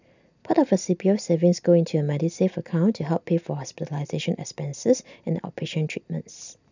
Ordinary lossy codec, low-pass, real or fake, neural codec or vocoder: none; 7.2 kHz; real; none